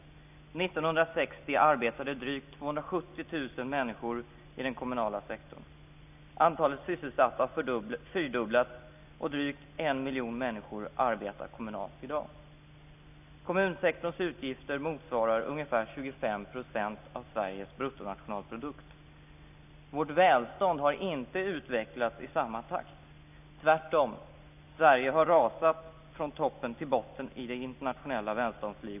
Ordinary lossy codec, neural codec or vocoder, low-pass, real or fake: none; none; 3.6 kHz; real